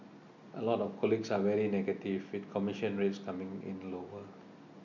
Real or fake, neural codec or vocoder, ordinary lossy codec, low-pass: real; none; none; 7.2 kHz